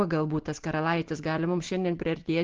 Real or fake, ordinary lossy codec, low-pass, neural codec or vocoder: real; Opus, 16 kbps; 7.2 kHz; none